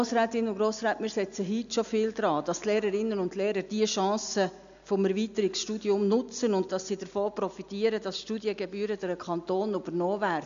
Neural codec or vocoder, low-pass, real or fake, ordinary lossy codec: none; 7.2 kHz; real; AAC, 96 kbps